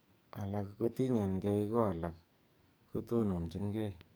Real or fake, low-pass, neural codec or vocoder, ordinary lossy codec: fake; none; codec, 44.1 kHz, 2.6 kbps, SNAC; none